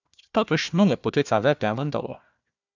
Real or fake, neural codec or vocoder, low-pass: fake; codec, 16 kHz, 1 kbps, FunCodec, trained on Chinese and English, 50 frames a second; 7.2 kHz